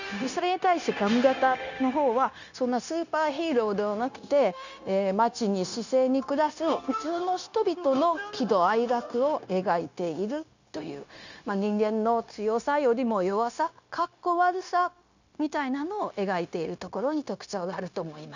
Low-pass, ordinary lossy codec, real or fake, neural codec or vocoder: 7.2 kHz; none; fake; codec, 16 kHz, 0.9 kbps, LongCat-Audio-Codec